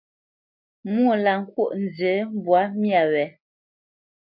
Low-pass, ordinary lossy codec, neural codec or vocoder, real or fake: 5.4 kHz; MP3, 48 kbps; none; real